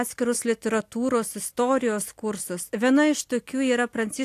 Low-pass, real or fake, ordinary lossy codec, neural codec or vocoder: 14.4 kHz; real; AAC, 64 kbps; none